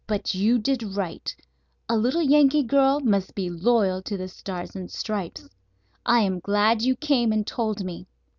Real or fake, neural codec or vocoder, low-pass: real; none; 7.2 kHz